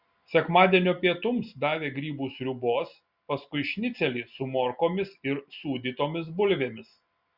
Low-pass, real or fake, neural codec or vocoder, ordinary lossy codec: 5.4 kHz; real; none; Opus, 64 kbps